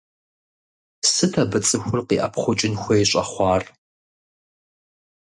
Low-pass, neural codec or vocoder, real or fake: 10.8 kHz; none; real